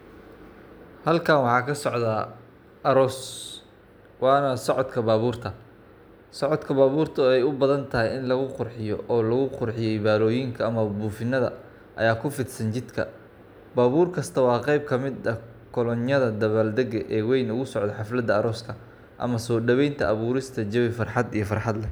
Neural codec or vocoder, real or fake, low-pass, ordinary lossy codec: none; real; none; none